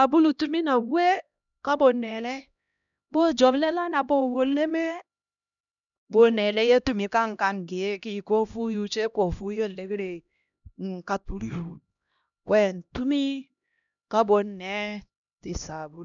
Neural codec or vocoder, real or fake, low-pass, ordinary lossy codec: codec, 16 kHz, 1 kbps, X-Codec, HuBERT features, trained on LibriSpeech; fake; 7.2 kHz; none